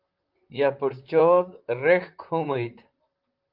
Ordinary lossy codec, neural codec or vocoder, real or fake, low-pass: Opus, 24 kbps; vocoder, 44.1 kHz, 128 mel bands, Pupu-Vocoder; fake; 5.4 kHz